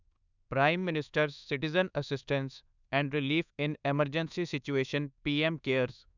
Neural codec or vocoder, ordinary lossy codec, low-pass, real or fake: codec, 24 kHz, 1.2 kbps, DualCodec; none; 7.2 kHz; fake